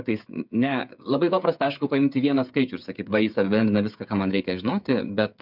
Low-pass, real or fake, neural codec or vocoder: 5.4 kHz; fake; codec, 16 kHz, 8 kbps, FreqCodec, smaller model